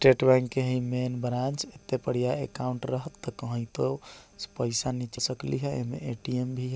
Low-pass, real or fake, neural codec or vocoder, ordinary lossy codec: none; real; none; none